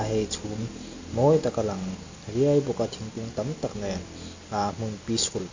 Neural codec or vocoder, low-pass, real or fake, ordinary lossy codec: none; 7.2 kHz; real; AAC, 48 kbps